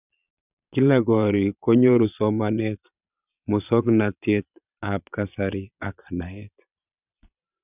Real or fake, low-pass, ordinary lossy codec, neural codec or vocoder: fake; 3.6 kHz; none; vocoder, 22.05 kHz, 80 mel bands, Vocos